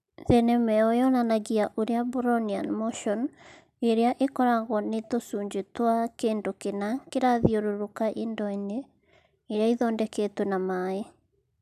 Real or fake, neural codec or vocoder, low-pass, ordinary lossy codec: real; none; 14.4 kHz; none